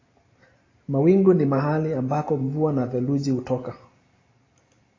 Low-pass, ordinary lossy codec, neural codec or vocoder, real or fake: 7.2 kHz; AAC, 32 kbps; none; real